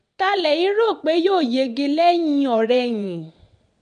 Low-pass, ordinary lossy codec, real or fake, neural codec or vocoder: 9.9 kHz; MP3, 64 kbps; fake; vocoder, 22.05 kHz, 80 mel bands, WaveNeXt